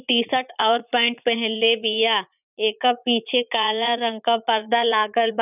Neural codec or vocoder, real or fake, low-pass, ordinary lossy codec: vocoder, 44.1 kHz, 80 mel bands, Vocos; fake; 3.6 kHz; none